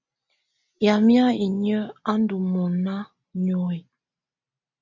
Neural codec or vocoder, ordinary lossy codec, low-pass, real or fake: none; MP3, 64 kbps; 7.2 kHz; real